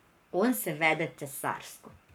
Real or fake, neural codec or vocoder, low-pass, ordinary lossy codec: fake; codec, 44.1 kHz, 7.8 kbps, Pupu-Codec; none; none